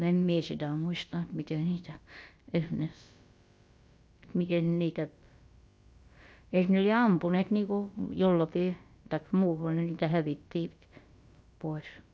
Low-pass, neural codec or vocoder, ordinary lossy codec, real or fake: none; codec, 16 kHz, about 1 kbps, DyCAST, with the encoder's durations; none; fake